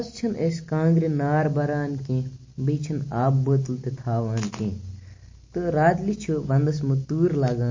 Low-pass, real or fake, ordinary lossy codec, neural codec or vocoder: 7.2 kHz; real; MP3, 32 kbps; none